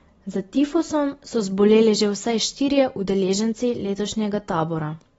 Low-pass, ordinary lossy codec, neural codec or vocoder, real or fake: 19.8 kHz; AAC, 24 kbps; none; real